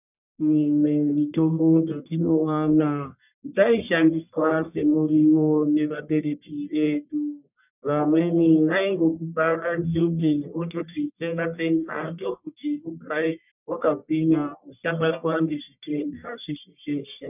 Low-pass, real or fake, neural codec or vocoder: 3.6 kHz; fake; codec, 44.1 kHz, 1.7 kbps, Pupu-Codec